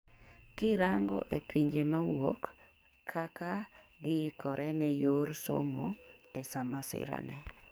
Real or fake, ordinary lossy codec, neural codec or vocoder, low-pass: fake; none; codec, 44.1 kHz, 2.6 kbps, SNAC; none